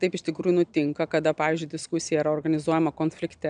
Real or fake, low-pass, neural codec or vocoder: real; 9.9 kHz; none